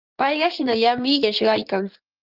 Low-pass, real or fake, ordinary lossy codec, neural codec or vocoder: 5.4 kHz; fake; Opus, 24 kbps; codec, 16 kHz in and 24 kHz out, 2.2 kbps, FireRedTTS-2 codec